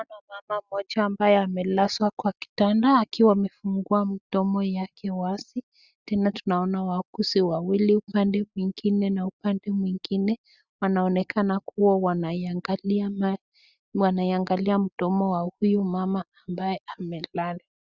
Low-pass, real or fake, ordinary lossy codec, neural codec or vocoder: 7.2 kHz; real; Opus, 64 kbps; none